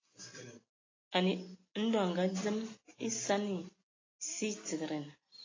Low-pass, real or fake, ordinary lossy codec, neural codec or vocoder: 7.2 kHz; real; AAC, 32 kbps; none